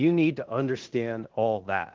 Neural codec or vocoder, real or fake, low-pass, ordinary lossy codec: codec, 16 kHz in and 24 kHz out, 0.9 kbps, LongCat-Audio-Codec, fine tuned four codebook decoder; fake; 7.2 kHz; Opus, 16 kbps